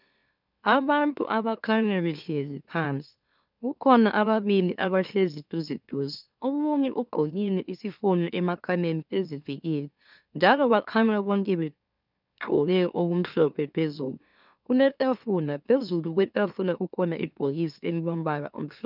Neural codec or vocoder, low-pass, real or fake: autoencoder, 44.1 kHz, a latent of 192 numbers a frame, MeloTTS; 5.4 kHz; fake